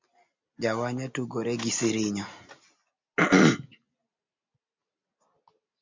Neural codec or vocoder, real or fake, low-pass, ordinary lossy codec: none; real; 7.2 kHz; MP3, 64 kbps